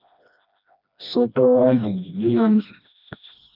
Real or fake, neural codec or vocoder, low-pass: fake; codec, 16 kHz, 1 kbps, FreqCodec, smaller model; 5.4 kHz